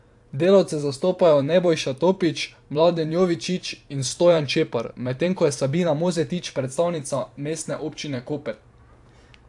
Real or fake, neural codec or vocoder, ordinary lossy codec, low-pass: fake; vocoder, 44.1 kHz, 128 mel bands every 512 samples, BigVGAN v2; AAC, 64 kbps; 10.8 kHz